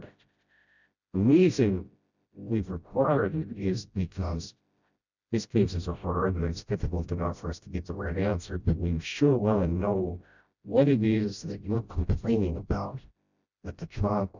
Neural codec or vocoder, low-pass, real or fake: codec, 16 kHz, 0.5 kbps, FreqCodec, smaller model; 7.2 kHz; fake